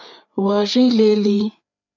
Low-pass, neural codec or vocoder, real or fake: 7.2 kHz; codec, 16 kHz, 4 kbps, FreqCodec, larger model; fake